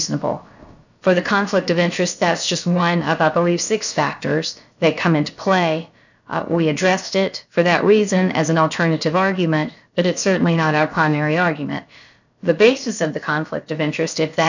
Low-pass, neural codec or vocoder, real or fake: 7.2 kHz; codec, 16 kHz, about 1 kbps, DyCAST, with the encoder's durations; fake